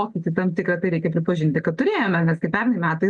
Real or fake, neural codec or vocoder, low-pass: real; none; 10.8 kHz